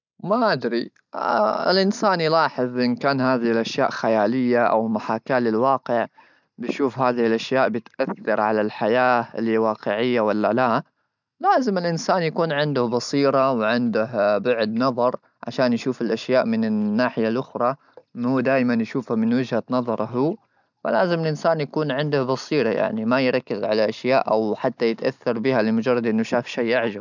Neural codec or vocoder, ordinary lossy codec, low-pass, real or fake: none; none; 7.2 kHz; real